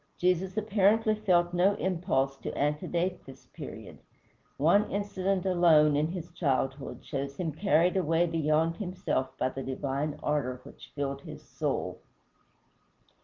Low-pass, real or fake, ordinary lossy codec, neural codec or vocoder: 7.2 kHz; real; Opus, 32 kbps; none